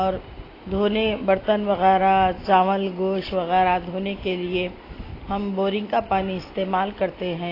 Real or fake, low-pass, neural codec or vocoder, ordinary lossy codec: real; 5.4 kHz; none; AAC, 24 kbps